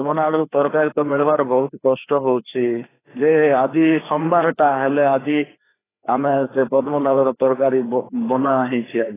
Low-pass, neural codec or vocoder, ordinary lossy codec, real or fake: 3.6 kHz; codec, 16 kHz, 2 kbps, FreqCodec, larger model; AAC, 16 kbps; fake